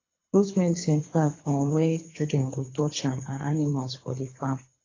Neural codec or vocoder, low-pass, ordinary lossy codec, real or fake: codec, 24 kHz, 3 kbps, HILCodec; 7.2 kHz; AAC, 32 kbps; fake